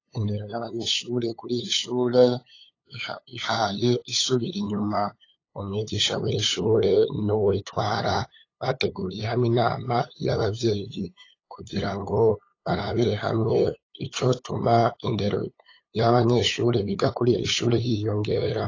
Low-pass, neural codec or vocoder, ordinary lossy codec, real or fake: 7.2 kHz; codec, 16 kHz, 8 kbps, FunCodec, trained on LibriTTS, 25 frames a second; AAC, 32 kbps; fake